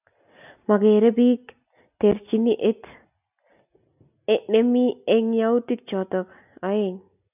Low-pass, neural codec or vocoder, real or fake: 3.6 kHz; none; real